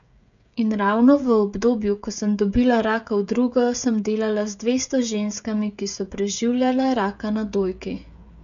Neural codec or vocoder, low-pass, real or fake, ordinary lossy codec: codec, 16 kHz, 16 kbps, FreqCodec, smaller model; 7.2 kHz; fake; none